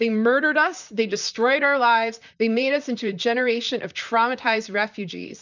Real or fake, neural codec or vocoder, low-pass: fake; vocoder, 44.1 kHz, 128 mel bands, Pupu-Vocoder; 7.2 kHz